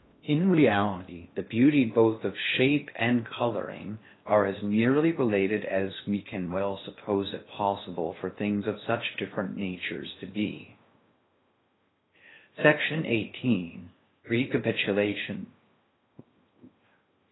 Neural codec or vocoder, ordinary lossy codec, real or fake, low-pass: codec, 16 kHz in and 24 kHz out, 0.6 kbps, FocalCodec, streaming, 2048 codes; AAC, 16 kbps; fake; 7.2 kHz